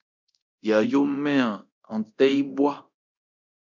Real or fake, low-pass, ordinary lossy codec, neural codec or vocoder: fake; 7.2 kHz; MP3, 64 kbps; codec, 24 kHz, 0.9 kbps, DualCodec